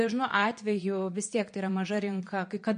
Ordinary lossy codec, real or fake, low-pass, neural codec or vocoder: MP3, 48 kbps; fake; 9.9 kHz; vocoder, 22.05 kHz, 80 mel bands, Vocos